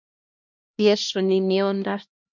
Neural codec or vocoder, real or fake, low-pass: codec, 16 kHz, 1 kbps, X-Codec, HuBERT features, trained on LibriSpeech; fake; 7.2 kHz